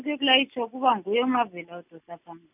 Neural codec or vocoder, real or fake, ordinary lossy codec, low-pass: none; real; none; 3.6 kHz